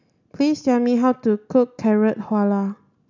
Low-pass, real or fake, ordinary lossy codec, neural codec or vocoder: 7.2 kHz; real; none; none